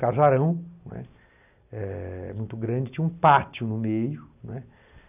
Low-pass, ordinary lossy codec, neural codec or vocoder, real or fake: 3.6 kHz; none; none; real